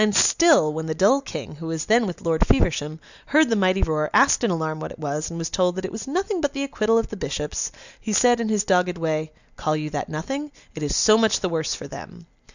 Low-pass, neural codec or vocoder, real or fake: 7.2 kHz; none; real